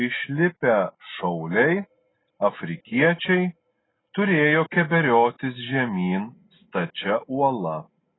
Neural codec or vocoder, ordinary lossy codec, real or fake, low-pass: none; AAC, 16 kbps; real; 7.2 kHz